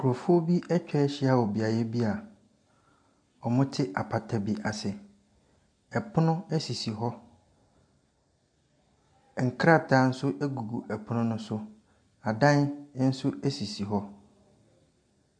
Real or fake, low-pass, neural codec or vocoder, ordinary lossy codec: real; 9.9 kHz; none; MP3, 64 kbps